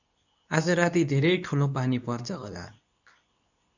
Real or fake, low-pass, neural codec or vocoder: fake; 7.2 kHz; codec, 24 kHz, 0.9 kbps, WavTokenizer, medium speech release version 2